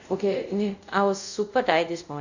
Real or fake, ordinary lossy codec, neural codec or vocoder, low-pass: fake; none; codec, 24 kHz, 0.5 kbps, DualCodec; 7.2 kHz